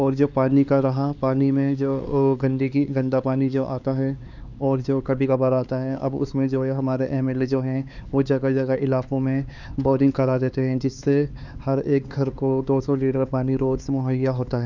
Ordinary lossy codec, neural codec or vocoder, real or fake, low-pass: none; codec, 16 kHz, 4 kbps, X-Codec, HuBERT features, trained on LibriSpeech; fake; 7.2 kHz